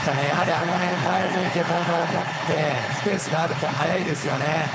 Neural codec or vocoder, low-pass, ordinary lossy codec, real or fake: codec, 16 kHz, 4.8 kbps, FACodec; none; none; fake